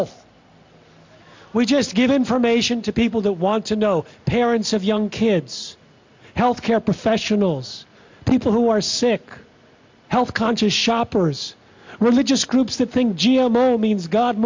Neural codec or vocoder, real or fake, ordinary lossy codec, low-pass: none; real; MP3, 48 kbps; 7.2 kHz